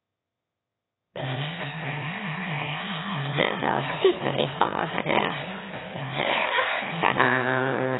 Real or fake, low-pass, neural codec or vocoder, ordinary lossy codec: fake; 7.2 kHz; autoencoder, 22.05 kHz, a latent of 192 numbers a frame, VITS, trained on one speaker; AAC, 16 kbps